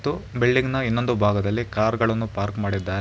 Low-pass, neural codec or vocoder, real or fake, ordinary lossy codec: none; none; real; none